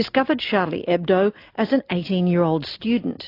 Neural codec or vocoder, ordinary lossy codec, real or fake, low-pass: none; AAC, 32 kbps; real; 5.4 kHz